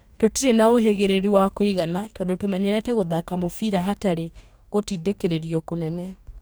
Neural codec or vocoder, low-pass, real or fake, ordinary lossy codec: codec, 44.1 kHz, 2.6 kbps, DAC; none; fake; none